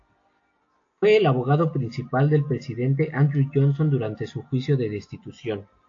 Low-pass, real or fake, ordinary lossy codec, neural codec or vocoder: 7.2 kHz; real; AAC, 48 kbps; none